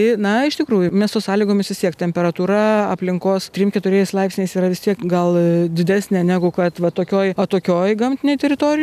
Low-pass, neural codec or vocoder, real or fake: 14.4 kHz; none; real